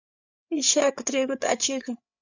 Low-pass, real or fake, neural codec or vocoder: 7.2 kHz; fake; codec, 16 kHz, 8 kbps, FreqCodec, larger model